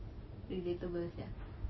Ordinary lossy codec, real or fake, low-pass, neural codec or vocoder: MP3, 24 kbps; real; 7.2 kHz; none